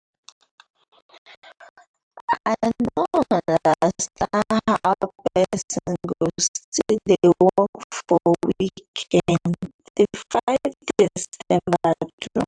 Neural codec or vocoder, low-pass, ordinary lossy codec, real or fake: vocoder, 44.1 kHz, 128 mel bands, Pupu-Vocoder; 9.9 kHz; AAC, 64 kbps; fake